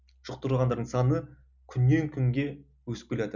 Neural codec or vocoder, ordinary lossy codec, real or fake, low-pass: none; none; real; 7.2 kHz